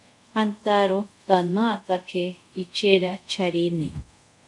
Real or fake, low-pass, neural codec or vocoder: fake; 10.8 kHz; codec, 24 kHz, 0.5 kbps, DualCodec